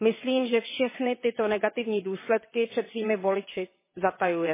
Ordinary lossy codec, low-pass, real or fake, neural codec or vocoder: MP3, 16 kbps; 3.6 kHz; fake; vocoder, 22.05 kHz, 80 mel bands, WaveNeXt